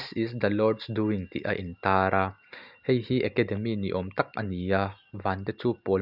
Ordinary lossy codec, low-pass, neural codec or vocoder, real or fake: none; 5.4 kHz; none; real